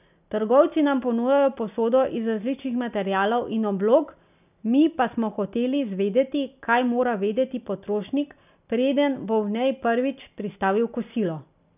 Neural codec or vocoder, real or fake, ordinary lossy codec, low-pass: none; real; none; 3.6 kHz